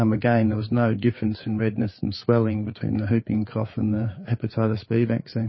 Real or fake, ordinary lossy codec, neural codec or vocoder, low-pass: fake; MP3, 24 kbps; codec, 16 kHz, 4 kbps, FreqCodec, larger model; 7.2 kHz